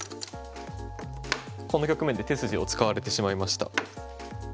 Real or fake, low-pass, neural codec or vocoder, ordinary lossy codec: real; none; none; none